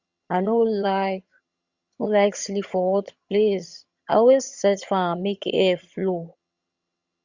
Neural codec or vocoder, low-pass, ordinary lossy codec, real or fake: vocoder, 22.05 kHz, 80 mel bands, HiFi-GAN; 7.2 kHz; Opus, 64 kbps; fake